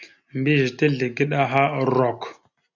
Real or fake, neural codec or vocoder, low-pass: real; none; 7.2 kHz